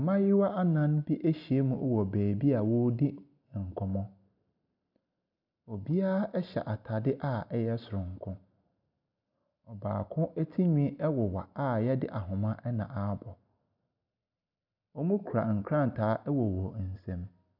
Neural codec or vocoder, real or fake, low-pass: none; real; 5.4 kHz